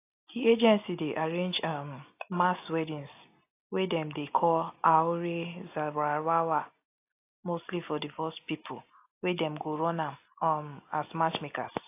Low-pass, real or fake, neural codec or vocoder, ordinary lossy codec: 3.6 kHz; real; none; AAC, 24 kbps